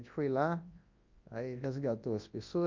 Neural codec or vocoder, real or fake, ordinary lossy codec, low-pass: codec, 24 kHz, 0.9 kbps, WavTokenizer, large speech release; fake; Opus, 24 kbps; 7.2 kHz